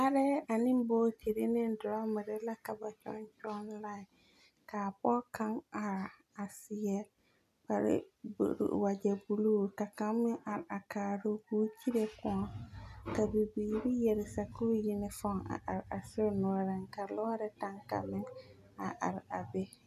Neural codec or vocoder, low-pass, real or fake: none; 14.4 kHz; real